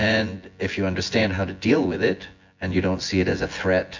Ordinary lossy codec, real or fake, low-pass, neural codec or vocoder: MP3, 48 kbps; fake; 7.2 kHz; vocoder, 24 kHz, 100 mel bands, Vocos